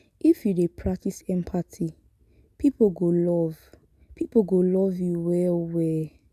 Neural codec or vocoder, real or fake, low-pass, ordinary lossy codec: none; real; 14.4 kHz; none